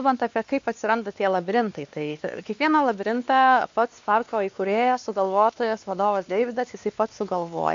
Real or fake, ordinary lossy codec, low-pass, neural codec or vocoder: fake; MP3, 64 kbps; 7.2 kHz; codec, 16 kHz, 2 kbps, X-Codec, WavLM features, trained on Multilingual LibriSpeech